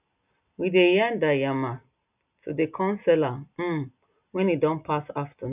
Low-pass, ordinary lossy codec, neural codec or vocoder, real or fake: 3.6 kHz; none; none; real